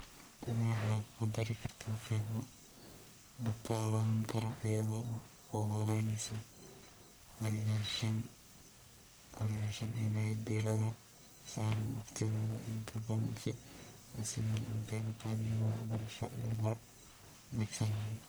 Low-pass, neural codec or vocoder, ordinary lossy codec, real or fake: none; codec, 44.1 kHz, 1.7 kbps, Pupu-Codec; none; fake